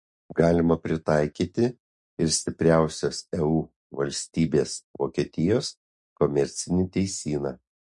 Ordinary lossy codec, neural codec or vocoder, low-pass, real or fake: MP3, 48 kbps; none; 10.8 kHz; real